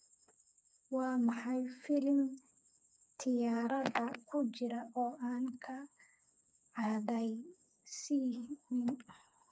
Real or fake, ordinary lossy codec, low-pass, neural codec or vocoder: fake; none; none; codec, 16 kHz, 4 kbps, FreqCodec, smaller model